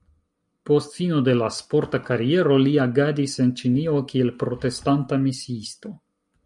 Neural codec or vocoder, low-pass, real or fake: none; 10.8 kHz; real